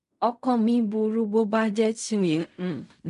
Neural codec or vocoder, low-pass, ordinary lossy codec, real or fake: codec, 16 kHz in and 24 kHz out, 0.4 kbps, LongCat-Audio-Codec, fine tuned four codebook decoder; 10.8 kHz; none; fake